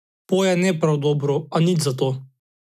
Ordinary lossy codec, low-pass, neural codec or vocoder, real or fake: none; 14.4 kHz; none; real